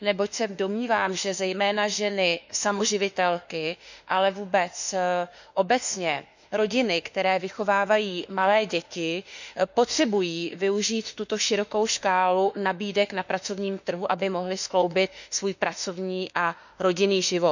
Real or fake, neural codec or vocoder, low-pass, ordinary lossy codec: fake; autoencoder, 48 kHz, 32 numbers a frame, DAC-VAE, trained on Japanese speech; 7.2 kHz; none